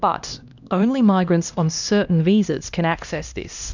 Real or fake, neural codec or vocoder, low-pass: fake; codec, 16 kHz, 1 kbps, X-Codec, HuBERT features, trained on LibriSpeech; 7.2 kHz